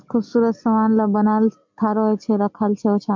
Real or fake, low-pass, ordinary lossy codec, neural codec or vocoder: real; 7.2 kHz; MP3, 64 kbps; none